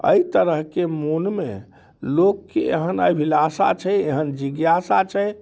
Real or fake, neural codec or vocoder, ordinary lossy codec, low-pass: real; none; none; none